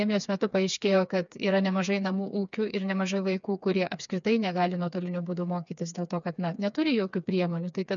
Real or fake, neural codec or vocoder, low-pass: fake; codec, 16 kHz, 4 kbps, FreqCodec, smaller model; 7.2 kHz